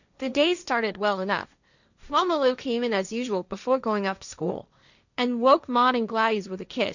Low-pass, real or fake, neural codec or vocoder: 7.2 kHz; fake; codec, 16 kHz, 1.1 kbps, Voila-Tokenizer